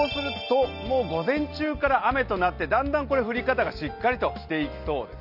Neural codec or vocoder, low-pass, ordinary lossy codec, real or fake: none; 5.4 kHz; none; real